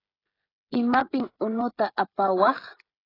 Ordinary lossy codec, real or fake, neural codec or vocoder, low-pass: AAC, 24 kbps; fake; codec, 16 kHz, 16 kbps, FreqCodec, smaller model; 5.4 kHz